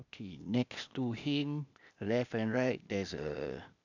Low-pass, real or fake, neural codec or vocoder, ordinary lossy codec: 7.2 kHz; fake; codec, 16 kHz, 0.8 kbps, ZipCodec; none